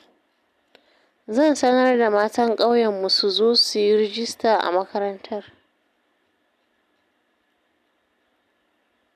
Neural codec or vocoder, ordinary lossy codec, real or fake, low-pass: none; none; real; 14.4 kHz